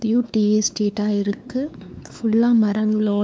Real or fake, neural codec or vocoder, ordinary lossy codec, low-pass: fake; codec, 16 kHz, 4 kbps, X-Codec, WavLM features, trained on Multilingual LibriSpeech; none; none